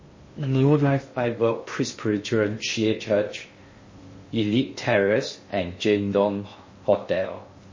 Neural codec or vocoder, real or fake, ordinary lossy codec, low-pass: codec, 16 kHz in and 24 kHz out, 0.6 kbps, FocalCodec, streaming, 2048 codes; fake; MP3, 32 kbps; 7.2 kHz